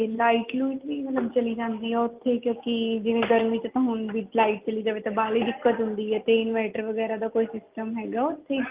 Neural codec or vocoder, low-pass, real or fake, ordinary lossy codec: none; 3.6 kHz; real; Opus, 32 kbps